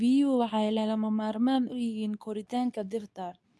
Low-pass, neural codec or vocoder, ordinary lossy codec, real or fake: none; codec, 24 kHz, 0.9 kbps, WavTokenizer, medium speech release version 2; none; fake